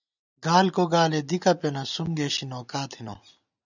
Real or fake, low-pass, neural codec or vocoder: real; 7.2 kHz; none